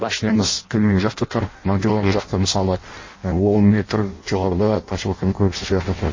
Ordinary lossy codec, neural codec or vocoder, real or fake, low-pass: MP3, 32 kbps; codec, 16 kHz in and 24 kHz out, 0.6 kbps, FireRedTTS-2 codec; fake; 7.2 kHz